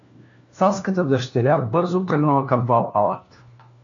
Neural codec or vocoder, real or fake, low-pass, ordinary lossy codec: codec, 16 kHz, 1 kbps, FunCodec, trained on LibriTTS, 50 frames a second; fake; 7.2 kHz; MP3, 64 kbps